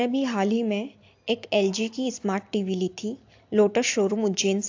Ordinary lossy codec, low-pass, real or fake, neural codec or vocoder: AAC, 48 kbps; 7.2 kHz; real; none